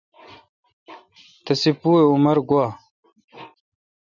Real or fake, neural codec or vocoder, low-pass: real; none; 7.2 kHz